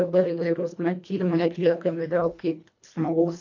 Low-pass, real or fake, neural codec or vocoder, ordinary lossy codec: 7.2 kHz; fake; codec, 24 kHz, 1.5 kbps, HILCodec; MP3, 48 kbps